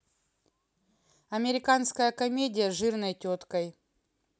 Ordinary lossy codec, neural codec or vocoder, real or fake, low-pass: none; none; real; none